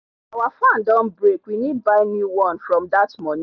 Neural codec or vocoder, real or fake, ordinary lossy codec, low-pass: none; real; none; 7.2 kHz